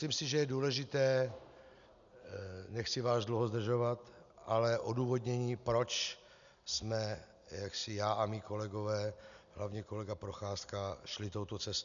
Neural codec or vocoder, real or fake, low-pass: none; real; 7.2 kHz